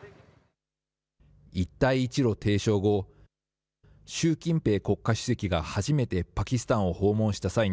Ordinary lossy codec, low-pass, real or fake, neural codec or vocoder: none; none; real; none